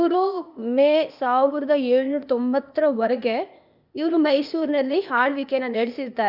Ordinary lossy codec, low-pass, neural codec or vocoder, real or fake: none; 5.4 kHz; codec, 16 kHz, 0.8 kbps, ZipCodec; fake